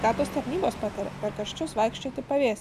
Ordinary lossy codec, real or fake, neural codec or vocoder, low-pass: AAC, 96 kbps; real; none; 14.4 kHz